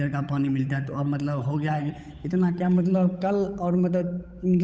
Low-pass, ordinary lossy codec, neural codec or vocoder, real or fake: none; none; codec, 16 kHz, 8 kbps, FunCodec, trained on Chinese and English, 25 frames a second; fake